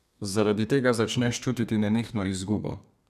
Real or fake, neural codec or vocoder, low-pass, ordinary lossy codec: fake; codec, 32 kHz, 1.9 kbps, SNAC; 14.4 kHz; none